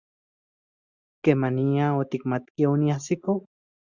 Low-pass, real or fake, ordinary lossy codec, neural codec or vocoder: 7.2 kHz; real; Opus, 64 kbps; none